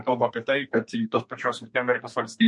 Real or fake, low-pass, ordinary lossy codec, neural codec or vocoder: fake; 10.8 kHz; MP3, 48 kbps; codec, 32 kHz, 1.9 kbps, SNAC